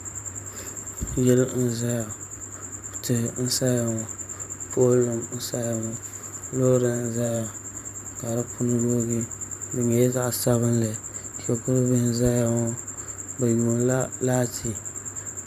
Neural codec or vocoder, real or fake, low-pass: vocoder, 44.1 kHz, 128 mel bands every 512 samples, BigVGAN v2; fake; 14.4 kHz